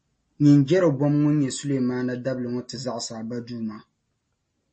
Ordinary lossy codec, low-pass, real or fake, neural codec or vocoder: MP3, 32 kbps; 10.8 kHz; real; none